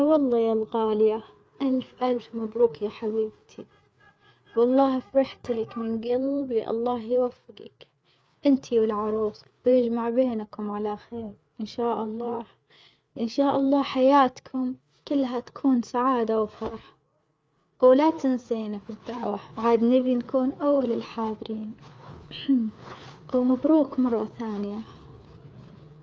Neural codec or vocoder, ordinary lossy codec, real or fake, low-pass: codec, 16 kHz, 4 kbps, FreqCodec, larger model; none; fake; none